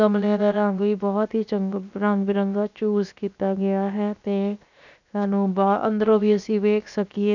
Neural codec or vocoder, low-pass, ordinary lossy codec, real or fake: codec, 16 kHz, 0.7 kbps, FocalCodec; 7.2 kHz; none; fake